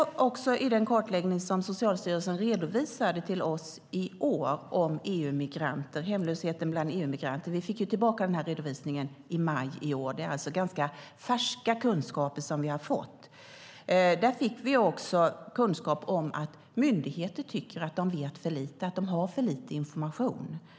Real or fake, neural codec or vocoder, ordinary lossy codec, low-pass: real; none; none; none